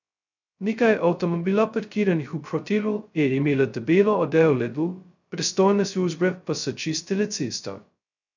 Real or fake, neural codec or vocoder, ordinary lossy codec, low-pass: fake; codec, 16 kHz, 0.2 kbps, FocalCodec; none; 7.2 kHz